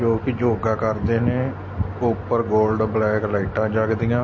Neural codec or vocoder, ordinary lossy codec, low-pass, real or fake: none; MP3, 32 kbps; 7.2 kHz; real